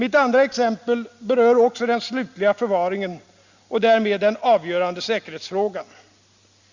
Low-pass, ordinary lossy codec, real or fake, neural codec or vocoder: 7.2 kHz; Opus, 64 kbps; real; none